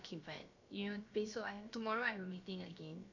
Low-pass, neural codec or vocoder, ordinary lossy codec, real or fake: 7.2 kHz; codec, 16 kHz, about 1 kbps, DyCAST, with the encoder's durations; AAC, 48 kbps; fake